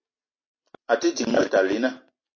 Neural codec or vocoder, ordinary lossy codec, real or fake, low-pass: none; MP3, 32 kbps; real; 7.2 kHz